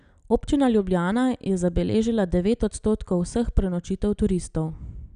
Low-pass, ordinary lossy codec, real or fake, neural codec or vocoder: 9.9 kHz; none; real; none